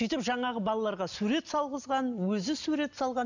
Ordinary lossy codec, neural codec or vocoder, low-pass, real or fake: none; none; 7.2 kHz; real